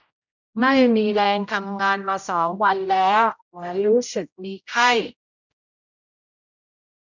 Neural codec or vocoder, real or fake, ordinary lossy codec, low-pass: codec, 16 kHz, 0.5 kbps, X-Codec, HuBERT features, trained on general audio; fake; none; 7.2 kHz